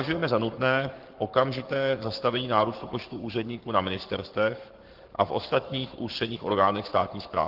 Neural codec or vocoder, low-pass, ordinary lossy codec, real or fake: codec, 44.1 kHz, 7.8 kbps, Pupu-Codec; 5.4 kHz; Opus, 16 kbps; fake